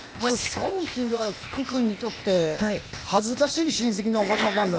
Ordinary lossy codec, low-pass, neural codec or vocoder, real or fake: none; none; codec, 16 kHz, 0.8 kbps, ZipCodec; fake